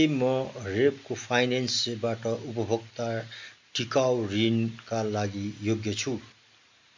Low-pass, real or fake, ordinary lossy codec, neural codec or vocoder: 7.2 kHz; real; MP3, 64 kbps; none